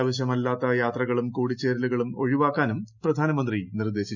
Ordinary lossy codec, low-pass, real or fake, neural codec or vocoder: none; 7.2 kHz; real; none